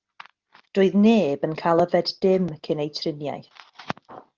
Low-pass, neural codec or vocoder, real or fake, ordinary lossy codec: 7.2 kHz; none; real; Opus, 24 kbps